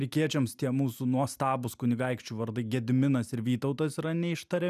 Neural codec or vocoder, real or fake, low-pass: none; real; 14.4 kHz